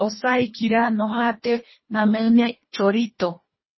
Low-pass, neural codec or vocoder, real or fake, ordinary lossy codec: 7.2 kHz; codec, 24 kHz, 1.5 kbps, HILCodec; fake; MP3, 24 kbps